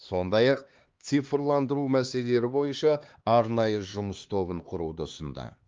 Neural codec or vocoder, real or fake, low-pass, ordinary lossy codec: codec, 16 kHz, 2 kbps, X-Codec, HuBERT features, trained on LibriSpeech; fake; 7.2 kHz; Opus, 32 kbps